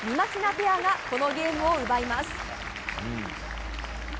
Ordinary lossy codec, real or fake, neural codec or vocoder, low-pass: none; real; none; none